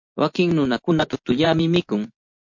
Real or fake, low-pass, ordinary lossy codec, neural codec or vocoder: fake; 7.2 kHz; MP3, 48 kbps; vocoder, 24 kHz, 100 mel bands, Vocos